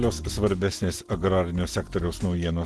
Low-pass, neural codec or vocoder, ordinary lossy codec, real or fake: 10.8 kHz; none; Opus, 16 kbps; real